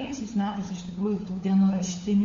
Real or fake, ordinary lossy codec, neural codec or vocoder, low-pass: fake; MP3, 48 kbps; codec, 16 kHz, 4 kbps, FunCodec, trained on LibriTTS, 50 frames a second; 7.2 kHz